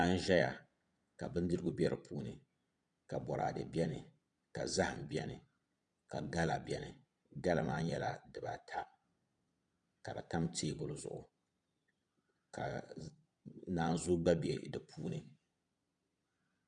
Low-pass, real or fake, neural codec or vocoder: 9.9 kHz; fake; vocoder, 22.05 kHz, 80 mel bands, Vocos